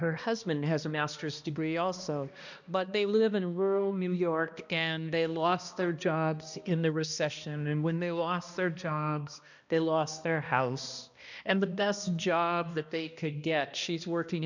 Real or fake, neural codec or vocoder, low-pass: fake; codec, 16 kHz, 1 kbps, X-Codec, HuBERT features, trained on balanced general audio; 7.2 kHz